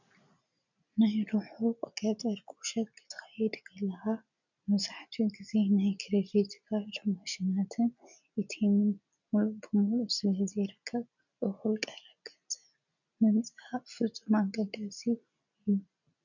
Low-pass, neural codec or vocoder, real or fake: 7.2 kHz; none; real